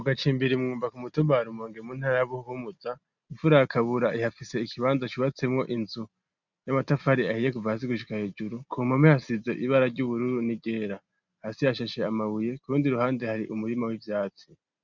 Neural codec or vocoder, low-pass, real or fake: none; 7.2 kHz; real